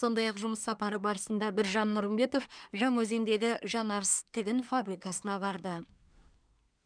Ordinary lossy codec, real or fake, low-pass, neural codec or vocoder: none; fake; 9.9 kHz; codec, 24 kHz, 1 kbps, SNAC